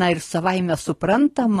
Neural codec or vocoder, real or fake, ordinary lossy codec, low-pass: none; real; AAC, 32 kbps; 19.8 kHz